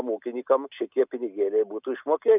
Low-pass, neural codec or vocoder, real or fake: 3.6 kHz; none; real